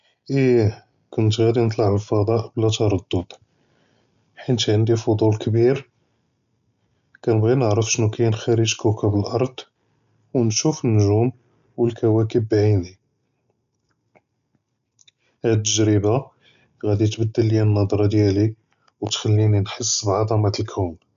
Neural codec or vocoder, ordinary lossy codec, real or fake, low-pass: none; none; real; 7.2 kHz